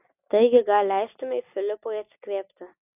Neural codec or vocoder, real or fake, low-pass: none; real; 3.6 kHz